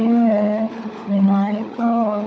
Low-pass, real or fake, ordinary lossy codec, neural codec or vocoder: none; fake; none; codec, 16 kHz, 16 kbps, FunCodec, trained on LibriTTS, 50 frames a second